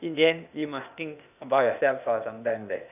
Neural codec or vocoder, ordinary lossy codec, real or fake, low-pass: codec, 16 kHz, 0.8 kbps, ZipCodec; none; fake; 3.6 kHz